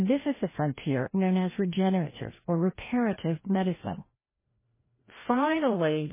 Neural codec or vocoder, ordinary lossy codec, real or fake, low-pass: codec, 16 kHz, 1 kbps, FreqCodec, larger model; MP3, 16 kbps; fake; 3.6 kHz